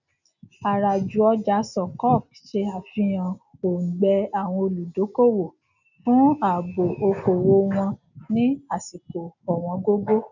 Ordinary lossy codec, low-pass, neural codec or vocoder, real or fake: none; 7.2 kHz; none; real